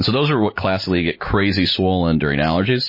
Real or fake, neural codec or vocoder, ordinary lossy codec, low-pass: real; none; MP3, 24 kbps; 5.4 kHz